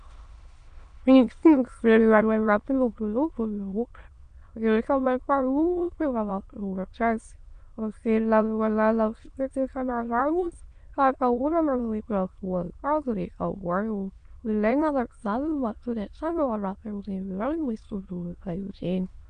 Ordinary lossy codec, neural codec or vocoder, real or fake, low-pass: Opus, 64 kbps; autoencoder, 22.05 kHz, a latent of 192 numbers a frame, VITS, trained on many speakers; fake; 9.9 kHz